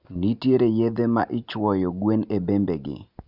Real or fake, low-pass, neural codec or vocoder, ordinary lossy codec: real; 5.4 kHz; none; none